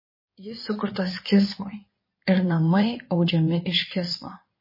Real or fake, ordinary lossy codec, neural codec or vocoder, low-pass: fake; MP3, 24 kbps; codec, 16 kHz in and 24 kHz out, 2.2 kbps, FireRedTTS-2 codec; 5.4 kHz